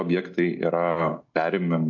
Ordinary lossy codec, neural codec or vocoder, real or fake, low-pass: MP3, 48 kbps; none; real; 7.2 kHz